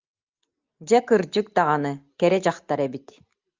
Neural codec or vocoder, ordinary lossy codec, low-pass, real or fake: none; Opus, 24 kbps; 7.2 kHz; real